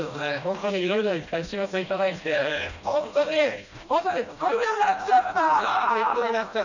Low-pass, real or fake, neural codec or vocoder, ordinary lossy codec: 7.2 kHz; fake; codec, 16 kHz, 1 kbps, FreqCodec, smaller model; none